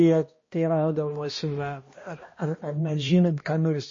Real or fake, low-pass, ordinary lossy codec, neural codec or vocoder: fake; 7.2 kHz; MP3, 32 kbps; codec, 16 kHz, 1 kbps, X-Codec, HuBERT features, trained on balanced general audio